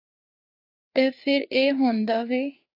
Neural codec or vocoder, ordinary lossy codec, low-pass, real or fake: codec, 16 kHz, 4 kbps, FreqCodec, larger model; AAC, 32 kbps; 5.4 kHz; fake